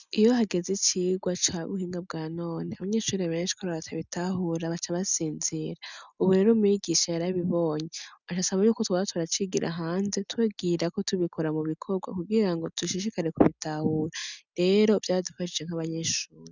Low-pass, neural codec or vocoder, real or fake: 7.2 kHz; none; real